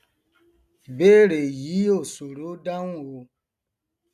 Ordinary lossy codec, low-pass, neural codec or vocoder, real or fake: none; 14.4 kHz; none; real